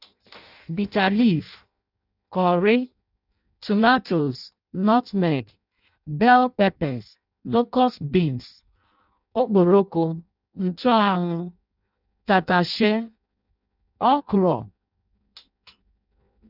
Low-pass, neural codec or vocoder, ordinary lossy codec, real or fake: 5.4 kHz; codec, 16 kHz in and 24 kHz out, 0.6 kbps, FireRedTTS-2 codec; none; fake